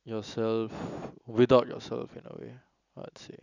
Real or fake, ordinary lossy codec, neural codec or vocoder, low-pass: real; none; none; 7.2 kHz